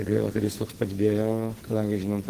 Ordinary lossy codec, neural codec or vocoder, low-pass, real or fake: Opus, 16 kbps; codec, 44.1 kHz, 2.6 kbps, SNAC; 14.4 kHz; fake